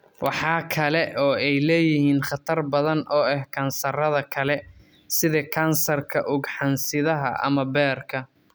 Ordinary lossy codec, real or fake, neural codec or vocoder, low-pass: none; real; none; none